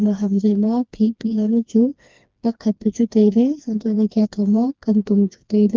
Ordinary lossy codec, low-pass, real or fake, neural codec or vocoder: Opus, 32 kbps; 7.2 kHz; fake; codec, 16 kHz, 2 kbps, FreqCodec, smaller model